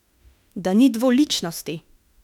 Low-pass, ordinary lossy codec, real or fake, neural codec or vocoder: 19.8 kHz; none; fake; autoencoder, 48 kHz, 32 numbers a frame, DAC-VAE, trained on Japanese speech